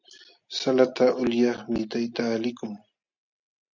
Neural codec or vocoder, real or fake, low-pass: none; real; 7.2 kHz